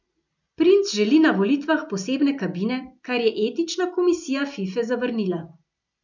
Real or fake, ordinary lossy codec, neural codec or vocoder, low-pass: real; none; none; 7.2 kHz